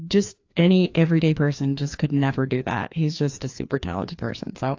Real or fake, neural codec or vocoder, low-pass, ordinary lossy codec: fake; codec, 16 kHz, 2 kbps, FreqCodec, larger model; 7.2 kHz; AAC, 48 kbps